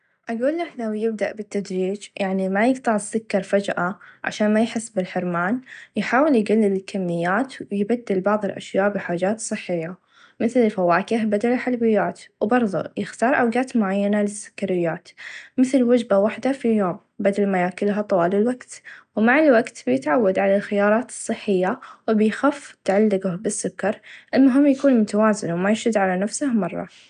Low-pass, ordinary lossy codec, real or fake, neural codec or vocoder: 9.9 kHz; none; real; none